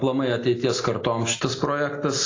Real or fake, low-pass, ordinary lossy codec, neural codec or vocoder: real; 7.2 kHz; AAC, 32 kbps; none